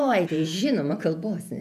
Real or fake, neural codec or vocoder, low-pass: fake; vocoder, 48 kHz, 128 mel bands, Vocos; 14.4 kHz